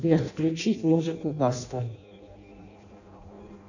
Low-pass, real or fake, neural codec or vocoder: 7.2 kHz; fake; codec, 16 kHz in and 24 kHz out, 0.6 kbps, FireRedTTS-2 codec